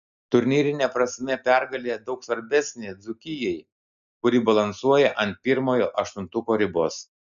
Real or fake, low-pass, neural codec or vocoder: real; 7.2 kHz; none